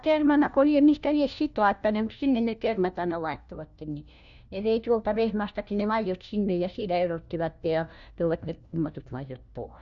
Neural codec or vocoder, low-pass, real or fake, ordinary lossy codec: codec, 16 kHz, 1 kbps, FunCodec, trained on LibriTTS, 50 frames a second; 7.2 kHz; fake; none